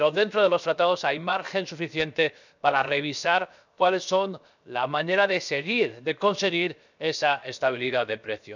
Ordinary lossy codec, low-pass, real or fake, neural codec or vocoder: none; 7.2 kHz; fake; codec, 16 kHz, 0.7 kbps, FocalCodec